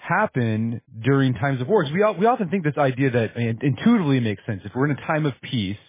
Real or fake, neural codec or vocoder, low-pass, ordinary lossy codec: real; none; 3.6 kHz; MP3, 16 kbps